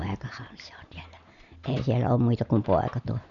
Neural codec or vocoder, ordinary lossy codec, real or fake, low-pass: none; none; real; 7.2 kHz